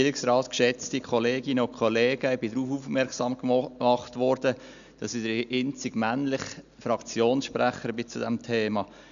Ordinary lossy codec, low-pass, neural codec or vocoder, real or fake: none; 7.2 kHz; none; real